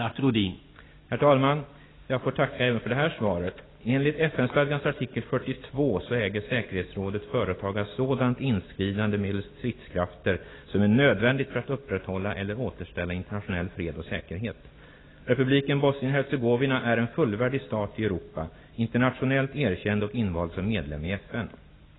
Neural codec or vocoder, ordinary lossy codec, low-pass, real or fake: none; AAC, 16 kbps; 7.2 kHz; real